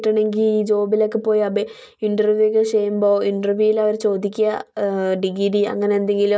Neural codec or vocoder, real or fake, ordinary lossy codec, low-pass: none; real; none; none